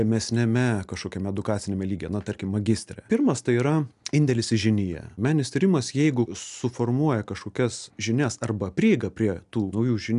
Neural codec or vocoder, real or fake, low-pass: none; real; 10.8 kHz